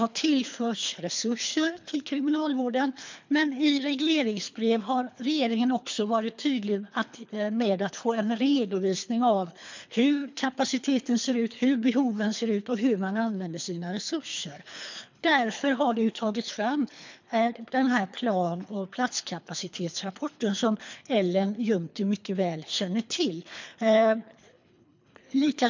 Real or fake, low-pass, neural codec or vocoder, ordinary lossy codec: fake; 7.2 kHz; codec, 24 kHz, 3 kbps, HILCodec; MP3, 64 kbps